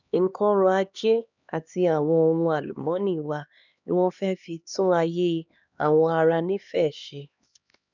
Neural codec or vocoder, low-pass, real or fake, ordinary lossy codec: codec, 16 kHz, 2 kbps, X-Codec, HuBERT features, trained on LibriSpeech; 7.2 kHz; fake; none